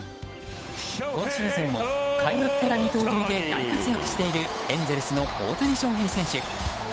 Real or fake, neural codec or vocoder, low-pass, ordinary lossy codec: fake; codec, 16 kHz, 8 kbps, FunCodec, trained on Chinese and English, 25 frames a second; none; none